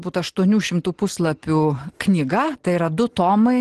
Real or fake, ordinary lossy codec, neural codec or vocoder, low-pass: real; Opus, 16 kbps; none; 10.8 kHz